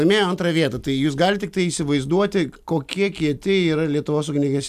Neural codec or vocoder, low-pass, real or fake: none; 14.4 kHz; real